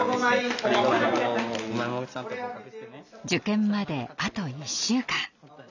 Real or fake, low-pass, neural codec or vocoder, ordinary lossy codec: real; 7.2 kHz; none; none